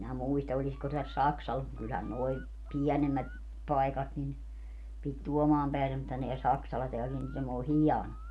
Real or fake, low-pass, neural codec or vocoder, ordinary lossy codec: real; none; none; none